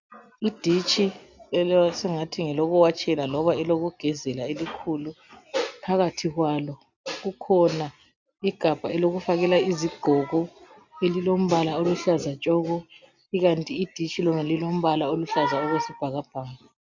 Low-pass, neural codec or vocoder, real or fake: 7.2 kHz; none; real